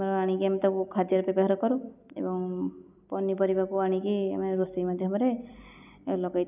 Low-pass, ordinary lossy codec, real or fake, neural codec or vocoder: 3.6 kHz; none; real; none